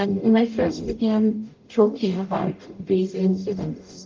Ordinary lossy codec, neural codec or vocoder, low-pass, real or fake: Opus, 24 kbps; codec, 44.1 kHz, 0.9 kbps, DAC; 7.2 kHz; fake